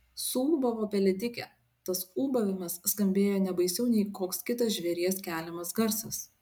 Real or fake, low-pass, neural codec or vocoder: real; 19.8 kHz; none